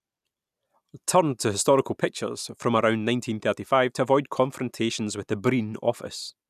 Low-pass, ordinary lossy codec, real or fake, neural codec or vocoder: 14.4 kHz; none; real; none